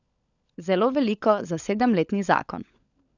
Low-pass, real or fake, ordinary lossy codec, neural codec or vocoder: 7.2 kHz; fake; none; codec, 16 kHz, 16 kbps, FunCodec, trained on LibriTTS, 50 frames a second